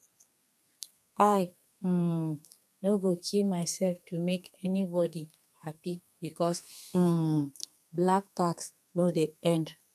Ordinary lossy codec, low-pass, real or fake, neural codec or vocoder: none; 14.4 kHz; fake; codec, 32 kHz, 1.9 kbps, SNAC